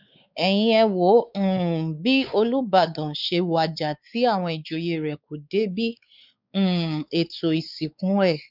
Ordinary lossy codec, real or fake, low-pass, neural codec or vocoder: none; fake; 5.4 kHz; codec, 16 kHz, 4 kbps, X-Codec, WavLM features, trained on Multilingual LibriSpeech